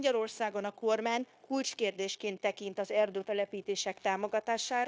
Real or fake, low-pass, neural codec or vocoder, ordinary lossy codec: fake; none; codec, 16 kHz, 0.9 kbps, LongCat-Audio-Codec; none